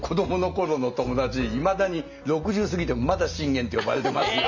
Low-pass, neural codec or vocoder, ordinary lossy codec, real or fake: 7.2 kHz; none; none; real